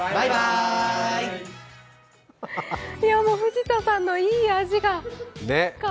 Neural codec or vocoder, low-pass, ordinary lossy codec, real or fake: none; none; none; real